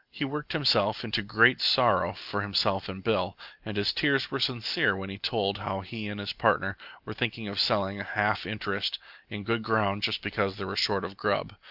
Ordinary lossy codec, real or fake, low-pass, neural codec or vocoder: Opus, 24 kbps; real; 5.4 kHz; none